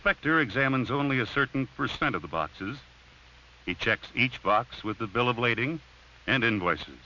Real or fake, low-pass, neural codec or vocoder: real; 7.2 kHz; none